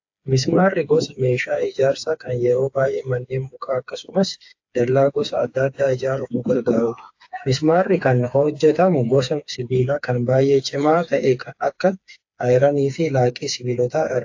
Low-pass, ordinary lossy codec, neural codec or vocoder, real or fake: 7.2 kHz; AAC, 48 kbps; codec, 16 kHz, 4 kbps, FreqCodec, smaller model; fake